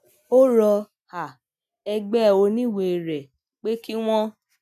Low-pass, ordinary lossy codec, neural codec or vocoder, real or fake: 14.4 kHz; none; none; real